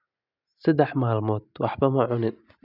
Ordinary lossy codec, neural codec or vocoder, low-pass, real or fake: none; none; 5.4 kHz; real